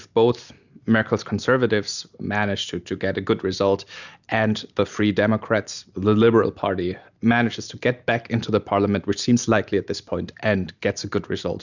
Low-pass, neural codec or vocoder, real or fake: 7.2 kHz; none; real